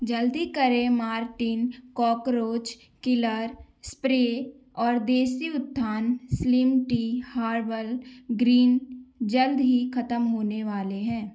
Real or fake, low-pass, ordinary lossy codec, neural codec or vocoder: real; none; none; none